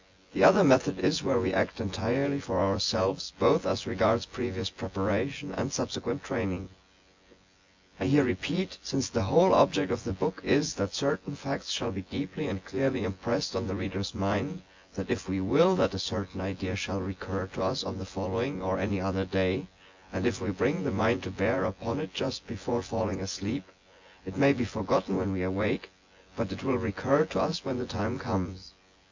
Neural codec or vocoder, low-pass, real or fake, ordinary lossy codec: vocoder, 24 kHz, 100 mel bands, Vocos; 7.2 kHz; fake; MP3, 64 kbps